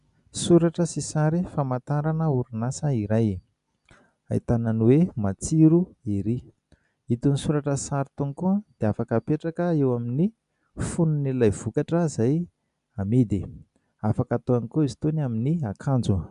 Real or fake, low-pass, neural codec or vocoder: real; 10.8 kHz; none